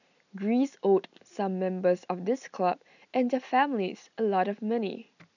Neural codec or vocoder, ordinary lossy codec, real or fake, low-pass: none; none; real; 7.2 kHz